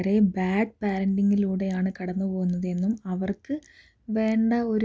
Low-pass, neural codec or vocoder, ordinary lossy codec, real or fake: none; none; none; real